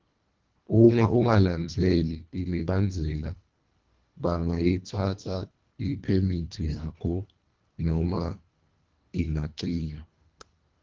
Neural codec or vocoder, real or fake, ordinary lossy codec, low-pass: codec, 24 kHz, 1.5 kbps, HILCodec; fake; Opus, 32 kbps; 7.2 kHz